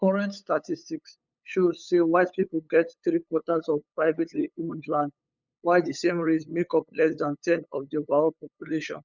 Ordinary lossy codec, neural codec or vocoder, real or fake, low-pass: none; codec, 16 kHz, 8 kbps, FunCodec, trained on LibriTTS, 25 frames a second; fake; 7.2 kHz